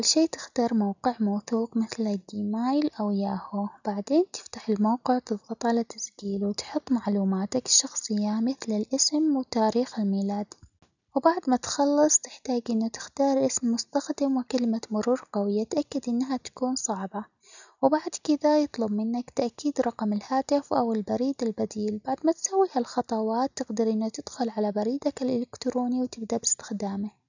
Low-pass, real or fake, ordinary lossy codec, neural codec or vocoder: 7.2 kHz; real; none; none